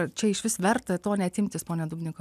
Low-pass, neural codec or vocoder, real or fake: 14.4 kHz; none; real